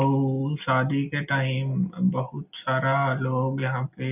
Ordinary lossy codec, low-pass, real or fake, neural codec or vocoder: none; 3.6 kHz; real; none